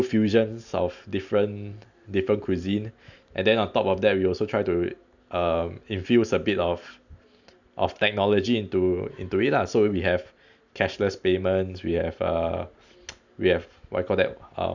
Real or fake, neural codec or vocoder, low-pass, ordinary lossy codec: real; none; 7.2 kHz; none